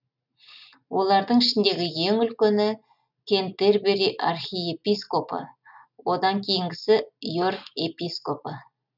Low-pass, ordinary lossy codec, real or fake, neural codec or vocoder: 5.4 kHz; none; real; none